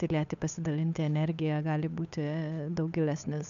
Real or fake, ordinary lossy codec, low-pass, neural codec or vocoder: fake; AAC, 96 kbps; 7.2 kHz; codec, 16 kHz, 8 kbps, FunCodec, trained on Chinese and English, 25 frames a second